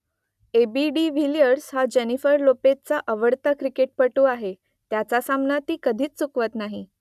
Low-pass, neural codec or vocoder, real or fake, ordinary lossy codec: 14.4 kHz; none; real; none